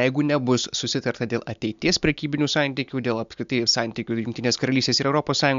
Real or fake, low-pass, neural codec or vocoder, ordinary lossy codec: real; 7.2 kHz; none; MP3, 64 kbps